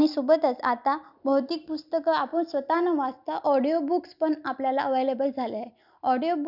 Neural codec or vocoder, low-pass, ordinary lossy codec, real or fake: none; 5.4 kHz; none; real